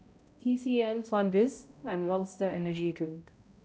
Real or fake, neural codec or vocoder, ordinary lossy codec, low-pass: fake; codec, 16 kHz, 0.5 kbps, X-Codec, HuBERT features, trained on balanced general audio; none; none